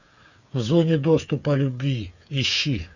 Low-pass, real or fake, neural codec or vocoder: 7.2 kHz; fake; codec, 16 kHz, 8 kbps, FreqCodec, smaller model